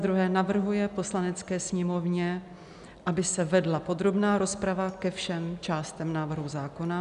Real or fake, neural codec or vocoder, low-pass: real; none; 10.8 kHz